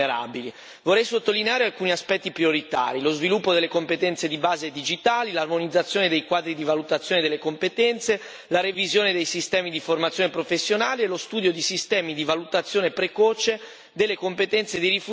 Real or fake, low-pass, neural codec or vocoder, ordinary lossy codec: real; none; none; none